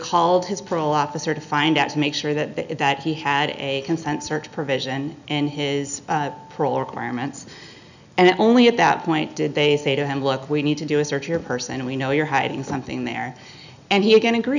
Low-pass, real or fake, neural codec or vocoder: 7.2 kHz; real; none